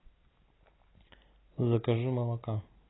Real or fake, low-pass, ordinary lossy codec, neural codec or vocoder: real; 7.2 kHz; AAC, 16 kbps; none